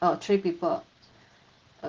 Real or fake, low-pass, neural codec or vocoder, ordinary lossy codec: real; 7.2 kHz; none; Opus, 16 kbps